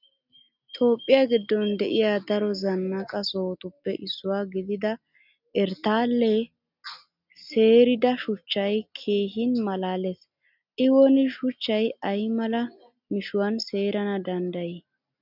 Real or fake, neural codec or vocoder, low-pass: real; none; 5.4 kHz